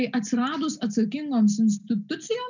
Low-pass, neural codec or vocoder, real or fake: 7.2 kHz; none; real